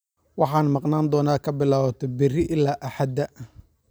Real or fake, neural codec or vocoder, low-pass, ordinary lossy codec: real; none; none; none